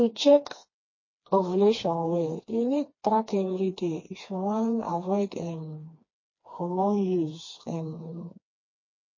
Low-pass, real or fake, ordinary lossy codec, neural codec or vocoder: 7.2 kHz; fake; MP3, 32 kbps; codec, 16 kHz, 2 kbps, FreqCodec, smaller model